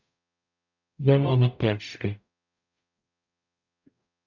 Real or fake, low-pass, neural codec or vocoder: fake; 7.2 kHz; codec, 44.1 kHz, 0.9 kbps, DAC